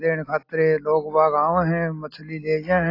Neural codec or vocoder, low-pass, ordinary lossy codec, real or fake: none; 5.4 kHz; AAC, 32 kbps; real